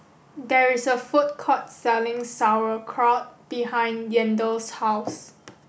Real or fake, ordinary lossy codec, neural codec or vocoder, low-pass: real; none; none; none